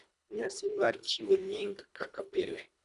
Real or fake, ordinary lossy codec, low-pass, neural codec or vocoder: fake; none; 10.8 kHz; codec, 24 kHz, 1.5 kbps, HILCodec